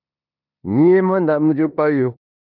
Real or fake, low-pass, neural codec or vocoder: fake; 5.4 kHz; codec, 16 kHz in and 24 kHz out, 0.9 kbps, LongCat-Audio-Codec, four codebook decoder